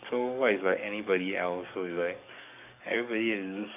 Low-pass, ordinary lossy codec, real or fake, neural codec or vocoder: 3.6 kHz; none; fake; codec, 44.1 kHz, 7.8 kbps, DAC